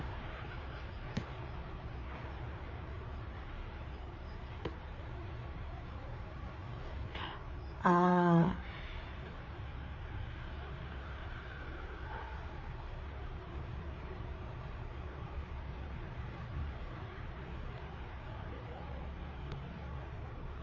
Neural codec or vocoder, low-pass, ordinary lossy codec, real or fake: codec, 16 kHz, 4 kbps, FreqCodec, larger model; 7.2 kHz; MP3, 32 kbps; fake